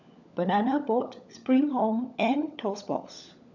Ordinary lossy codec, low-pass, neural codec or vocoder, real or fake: none; 7.2 kHz; codec, 16 kHz, 16 kbps, FunCodec, trained on LibriTTS, 50 frames a second; fake